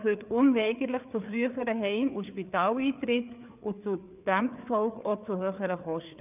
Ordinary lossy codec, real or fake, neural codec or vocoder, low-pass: none; fake; codec, 16 kHz, 4 kbps, FreqCodec, larger model; 3.6 kHz